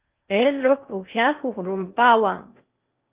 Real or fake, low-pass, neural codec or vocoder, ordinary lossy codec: fake; 3.6 kHz; codec, 16 kHz in and 24 kHz out, 0.6 kbps, FocalCodec, streaming, 2048 codes; Opus, 32 kbps